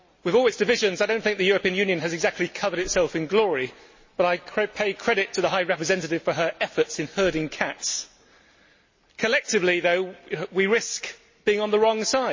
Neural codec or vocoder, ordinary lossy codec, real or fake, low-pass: none; MP3, 32 kbps; real; 7.2 kHz